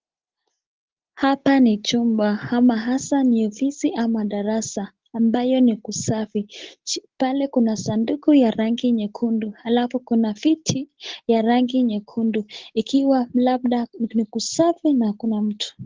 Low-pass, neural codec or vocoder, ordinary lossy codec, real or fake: 7.2 kHz; none; Opus, 16 kbps; real